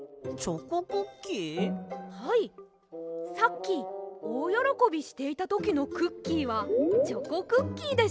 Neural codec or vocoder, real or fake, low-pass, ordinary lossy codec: none; real; none; none